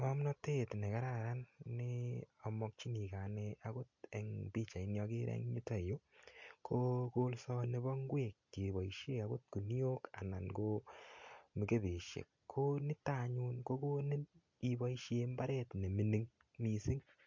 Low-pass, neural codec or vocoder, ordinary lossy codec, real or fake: 7.2 kHz; none; MP3, 48 kbps; real